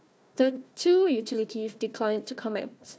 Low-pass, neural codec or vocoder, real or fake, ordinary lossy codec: none; codec, 16 kHz, 1 kbps, FunCodec, trained on Chinese and English, 50 frames a second; fake; none